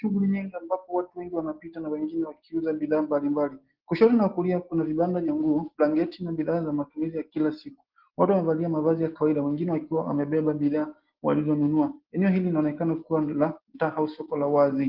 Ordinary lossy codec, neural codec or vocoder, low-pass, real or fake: Opus, 16 kbps; none; 5.4 kHz; real